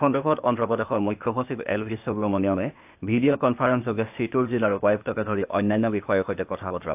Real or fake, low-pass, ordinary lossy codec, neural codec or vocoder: fake; 3.6 kHz; AAC, 32 kbps; codec, 16 kHz, 0.8 kbps, ZipCodec